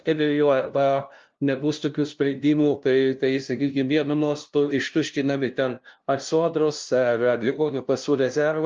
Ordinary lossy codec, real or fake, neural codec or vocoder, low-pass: Opus, 24 kbps; fake; codec, 16 kHz, 0.5 kbps, FunCodec, trained on LibriTTS, 25 frames a second; 7.2 kHz